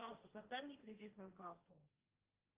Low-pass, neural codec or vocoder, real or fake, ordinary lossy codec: 3.6 kHz; codec, 16 kHz, 1.1 kbps, Voila-Tokenizer; fake; Opus, 32 kbps